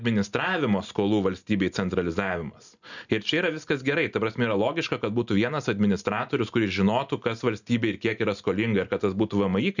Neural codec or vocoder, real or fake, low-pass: none; real; 7.2 kHz